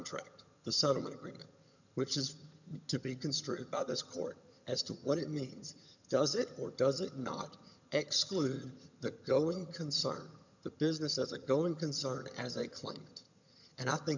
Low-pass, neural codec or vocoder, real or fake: 7.2 kHz; vocoder, 22.05 kHz, 80 mel bands, HiFi-GAN; fake